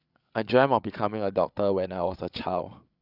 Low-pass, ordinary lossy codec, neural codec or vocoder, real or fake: 5.4 kHz; none; codec, 16 kHz, 8 kbps, FreqCodec, larger model; fake